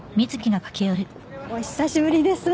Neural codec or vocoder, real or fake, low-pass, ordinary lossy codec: none; real; none; none